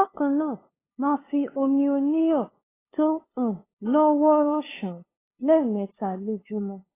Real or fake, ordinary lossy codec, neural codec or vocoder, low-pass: fake; AAC, 16 kbps; codec, 16 kHz, 2 kbps, FunCodec, trained on LibriTTS, 25 frames a second; 3.6 kHz